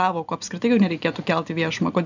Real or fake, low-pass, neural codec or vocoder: real; 7.2 kHz; none